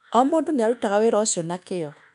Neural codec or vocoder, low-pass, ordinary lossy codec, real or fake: codec, 24 kHz, 1.2 kbps, DualCodec; 10.8 kHz; none; fake